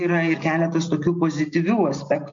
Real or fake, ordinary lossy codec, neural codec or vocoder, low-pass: real; MP3, 48 kbps; none; 7.2 kHz